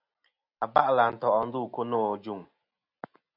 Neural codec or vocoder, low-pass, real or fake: none; 5.4 kHz; real